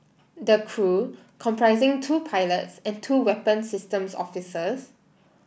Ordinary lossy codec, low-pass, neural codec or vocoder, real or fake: none; none; none; real